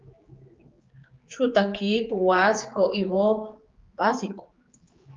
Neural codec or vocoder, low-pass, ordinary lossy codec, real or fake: codec, 16 kHz, 4 kbps, X-Codec, HuBERT features, trained on general audio; 7.2 kHz; Opus, 32 kbps; fake